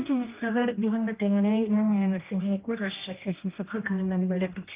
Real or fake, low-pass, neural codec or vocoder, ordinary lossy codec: fake; 3.6 kHz; codec, 16 kHz, 1 kbps, X-Codec, HuBERT features, trained on general audio; Opus, 32 kbps